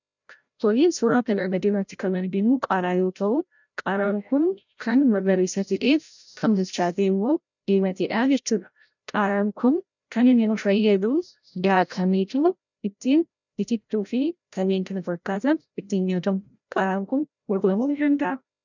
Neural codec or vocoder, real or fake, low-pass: codec, 16 kHz, 0.5 kbps, FreqCodec, larger model; fake; 7.2 kHz